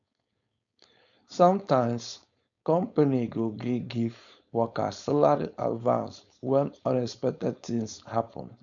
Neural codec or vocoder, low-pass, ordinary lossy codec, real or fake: codec, 16 kHz, 4.8 kbps, FACodec; 7.2 kHz; none; fake